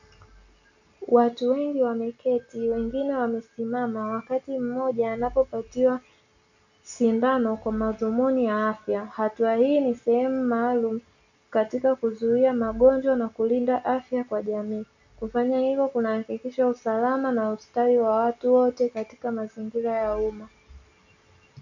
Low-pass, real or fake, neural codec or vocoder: 7.2 kHz; real; none